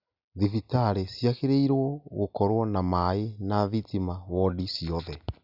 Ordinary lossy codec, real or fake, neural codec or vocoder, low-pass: none; real; none; 5.4 kHz